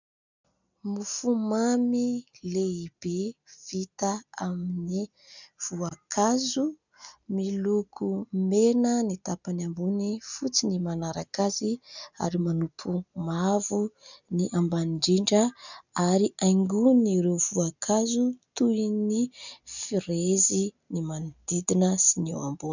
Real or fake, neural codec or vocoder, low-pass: real; none; 7.2 kHz